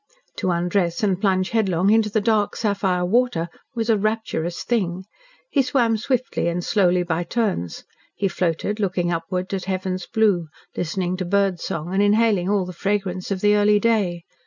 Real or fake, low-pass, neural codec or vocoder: real; 7.2 kHz; none